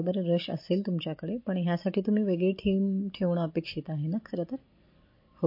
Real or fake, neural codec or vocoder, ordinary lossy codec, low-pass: real; none; MP3, 32 kbps; 5.4 kHz